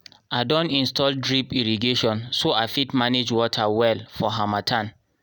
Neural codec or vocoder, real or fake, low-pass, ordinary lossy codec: none; real; none; none